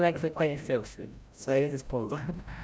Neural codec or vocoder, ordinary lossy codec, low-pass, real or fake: codec, 16 kHz, 0.5 kbps, FreqCodec, larger model; none; none; fake